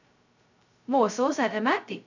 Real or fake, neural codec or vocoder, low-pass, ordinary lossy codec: fake; codec, 16 kHz, 0.2 kbps, FocalCodec; 7.2 kHz; none